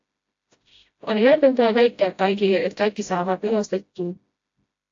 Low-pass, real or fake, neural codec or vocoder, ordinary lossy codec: 7.2 kHz; fake; codec, 16 kHz, 0.5 kbps, FreqCodec, smaller model; AAC, 48 kbps